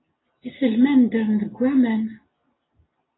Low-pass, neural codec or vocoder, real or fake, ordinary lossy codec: 7.2 kHz; none; real; AAC, 16 kbps